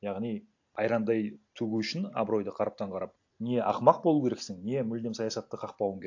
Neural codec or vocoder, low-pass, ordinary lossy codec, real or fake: none; 7.2 kHz; AAC, 48 kbps; real